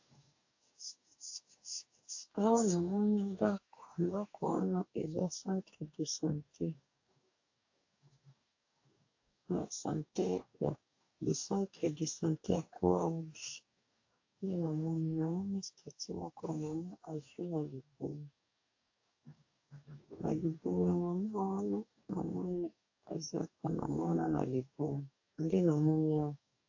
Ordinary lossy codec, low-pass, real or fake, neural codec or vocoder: AAC, 48 kbps; 7.2 kHz; fake; codec, 44.1 kHz, 2.6 kbps, DAC